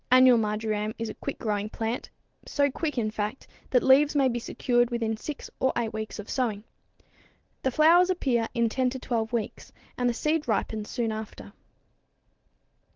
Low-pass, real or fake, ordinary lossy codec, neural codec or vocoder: 7.2 kHz; real; Opus, 16 kbps; none